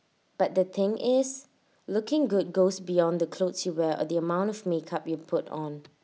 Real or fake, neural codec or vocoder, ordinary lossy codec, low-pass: real; none; none; none